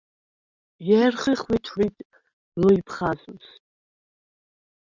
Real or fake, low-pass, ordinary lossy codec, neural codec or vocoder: real; 7.2 kHz; Opus, 64 kbps; none